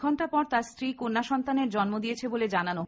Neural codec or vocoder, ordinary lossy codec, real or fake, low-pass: none; none; real; none